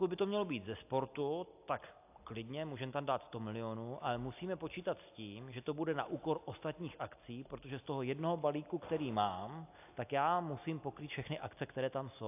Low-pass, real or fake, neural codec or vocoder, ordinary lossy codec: 3.6 kHz; real; none; AAC, 32 kbps